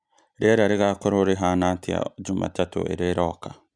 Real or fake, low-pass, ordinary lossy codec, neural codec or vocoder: real; 9.9 kHz; none; none